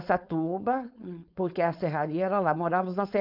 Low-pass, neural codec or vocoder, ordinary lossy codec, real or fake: 5.4 kHz; codec, 16 kHz, 4.8 kbps, FACodec; none; fake